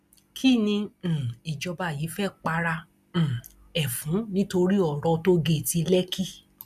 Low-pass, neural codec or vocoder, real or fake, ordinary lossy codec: 14.4 kHz; none; real; none